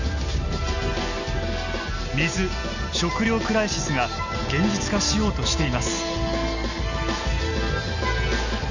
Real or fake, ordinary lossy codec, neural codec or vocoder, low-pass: real; none; none; 7.2 kHz